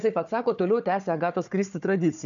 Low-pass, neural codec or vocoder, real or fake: 7.2 kHz; codec, 16 kHz, 4 kbps, FunCodec, trained on Chinese and English, 50 frames a second; fake